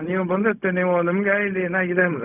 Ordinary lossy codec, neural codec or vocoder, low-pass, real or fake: none; codec, 16 kHz, 0.4 kbps, LongCat-Audio-Codec; 3.6 kHz; fake